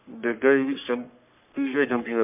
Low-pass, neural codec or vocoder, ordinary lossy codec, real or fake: 3.6 kHz; codec, 44.1 kHz, 3.4 kbps, Pupu-Codec; MP3, 32 kbps; fake